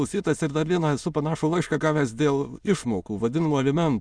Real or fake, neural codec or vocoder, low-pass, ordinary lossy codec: fake; autoencoder, 22.05 kHz, a latent of 192 numbers a frame, VITS, trained on many speakers; 9.9 kHz; Opus, 64 kbps